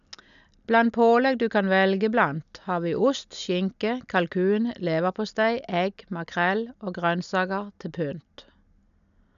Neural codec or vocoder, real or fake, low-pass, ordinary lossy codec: none; real; 7.2 kHz; none